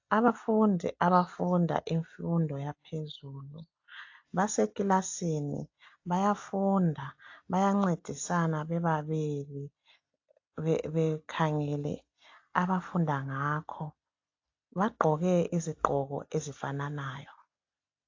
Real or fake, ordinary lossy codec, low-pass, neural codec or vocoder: real; AAC, 48 kbps; 7.2 kHz; none